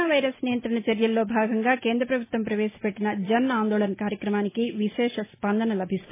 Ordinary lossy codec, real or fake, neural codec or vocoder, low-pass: MP3, 16 kbps; real; none; 3.6 kHz